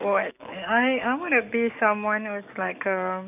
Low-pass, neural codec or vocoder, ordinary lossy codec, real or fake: 3.6 kHz; codec, 44.1 kHz, 7.8 kbps, DAC; none; fake